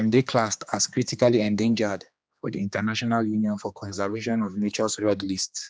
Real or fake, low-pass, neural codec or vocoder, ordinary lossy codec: fake; none; codec, 16 kHz, 2 kbps, X-Codec, HuBERT features, trained on general audio; none